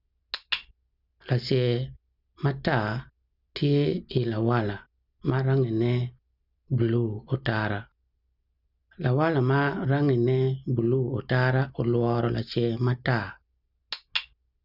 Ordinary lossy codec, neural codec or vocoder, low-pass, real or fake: none; none; 5.4 kHz; real